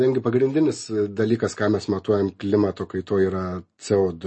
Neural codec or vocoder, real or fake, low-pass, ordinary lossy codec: none; real; 9.9 kHz; MP3, 32 kbps